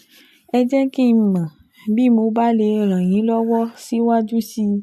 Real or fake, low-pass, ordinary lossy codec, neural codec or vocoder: real; 14.4 kHz; AAC, 96 kbps; none